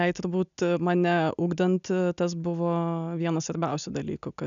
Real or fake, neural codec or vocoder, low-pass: real; none; 7.2 kHz